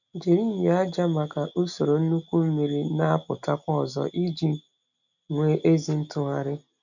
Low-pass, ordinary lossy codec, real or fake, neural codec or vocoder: 7.2 kHz; none; real; none